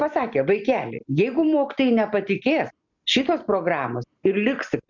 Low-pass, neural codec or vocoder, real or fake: 7.2 kHz; none; real